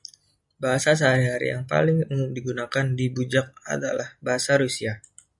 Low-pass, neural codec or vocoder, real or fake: 10.8 kHz; none; real